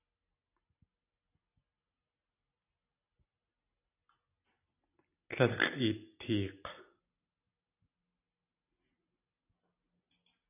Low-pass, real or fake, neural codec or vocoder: 3.6 kHz; real; none